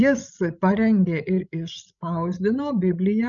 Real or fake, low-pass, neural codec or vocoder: fake; 7.2 kHz; codec, 16 kHz, 16 kbps, FreqCodec, larger model